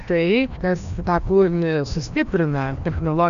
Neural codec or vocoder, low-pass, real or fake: codec, 16 kHz, 1 kbps, FreqCodec, larger model; 7.2 kHz; fake